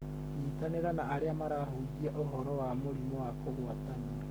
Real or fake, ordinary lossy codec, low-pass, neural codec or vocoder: fake; none; none; codec, 44.1 kHz, 7.8 kbps, Pupu-Codec